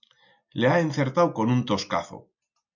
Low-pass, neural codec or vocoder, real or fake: 7.2 kHz; none; real